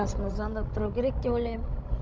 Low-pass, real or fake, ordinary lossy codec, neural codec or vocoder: none; fake; none; codec, 16 kHz, 16 kbps, FreqCodec, larger model